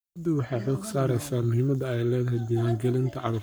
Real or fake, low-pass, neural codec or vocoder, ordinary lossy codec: fake; none; codec, 44.1 kHz, 7.8 kbps, Pupu-Codec; none